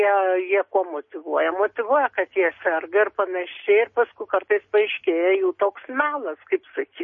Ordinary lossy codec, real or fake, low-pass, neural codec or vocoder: MP3, 32 kbps; real; 7.2 kHz; none